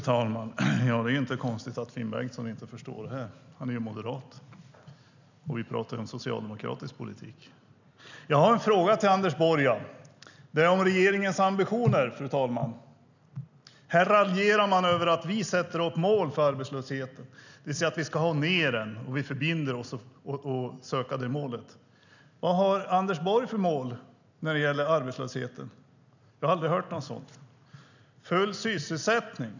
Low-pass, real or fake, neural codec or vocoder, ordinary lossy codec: 7.2 kHz; real; none; none